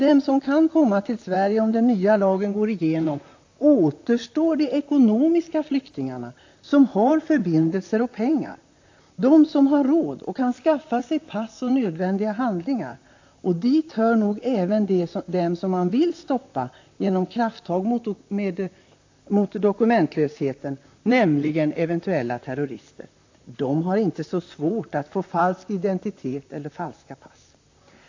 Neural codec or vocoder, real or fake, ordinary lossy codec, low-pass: vocoder, 44.1 kHz, 128 mel bands, Pupu-Vocoder; fake; AAC, 48 kbps; 7.2 kHz